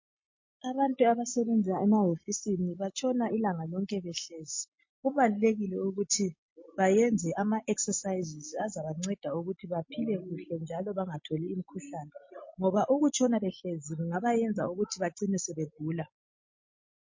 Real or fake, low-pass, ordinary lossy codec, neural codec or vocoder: real; 7.2 kHz; MP3, 32 kbps; none